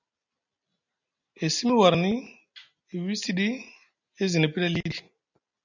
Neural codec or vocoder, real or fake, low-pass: none; real; 7.2 kHz